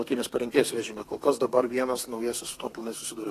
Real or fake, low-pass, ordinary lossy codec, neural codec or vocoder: fake; 14.4 kHz; AAC, 48 kbps; codec, 32 kHz, 1.9 kbps, SNAC